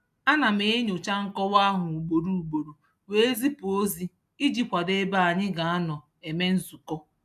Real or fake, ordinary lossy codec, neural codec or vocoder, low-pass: real; AAC, 96 kbps; none; 14.4 kHz